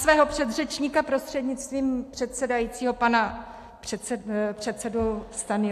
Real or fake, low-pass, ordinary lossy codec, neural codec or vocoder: real; 14.4 kHz; AAC, 64 kbps; none